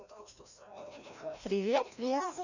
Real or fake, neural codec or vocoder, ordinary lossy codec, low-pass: fake; codec, 16 kHz, 1 kbps, FreqCodec, larger model; none; 7.2 kHz